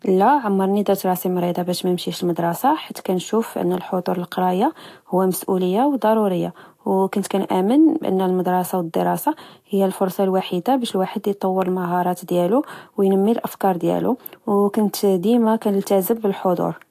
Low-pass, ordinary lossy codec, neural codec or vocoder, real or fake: 14.4 kHz; AAC, 64 kbps; none; real